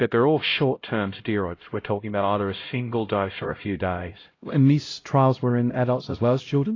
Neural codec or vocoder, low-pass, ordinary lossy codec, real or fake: codec, 16 kHz, 0.5 kbps, X-Codec, HuBERT features, trained on LibriSpeech; 7.2 kHz; AAC, 32 kbps; fake